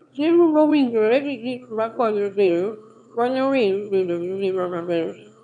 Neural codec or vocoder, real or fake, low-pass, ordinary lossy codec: autoencoder, 22.05 kHz, a latent of 192 numbers a frame, VITS, trained on one speaker; fake; 9.9 kHz; none